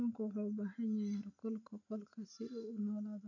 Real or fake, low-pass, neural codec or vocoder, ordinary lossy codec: real; 7.2 kHz; none; none